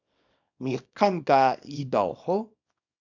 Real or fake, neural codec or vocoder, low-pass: fake; codec, 24 kHz, 0.9 kbps, WavTokenizer, small release; 7.2 kHz